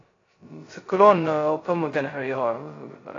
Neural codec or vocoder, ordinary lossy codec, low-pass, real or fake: codec, 16 kHz, 0.2 kbps, FocalCodec; AAC, 32 kbps; 7.2 kHz; fake